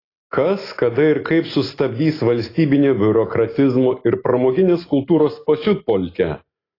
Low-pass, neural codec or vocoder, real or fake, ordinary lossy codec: 5.4 kHz; none; real; AAC, 24 kbps